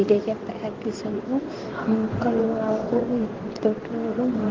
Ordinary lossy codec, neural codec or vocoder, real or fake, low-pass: Opus, 16 kbps; codec, 16 kHz in and 24 kHz out, 1 kbps, XY-Tokenizer; fake; 7.2 kHz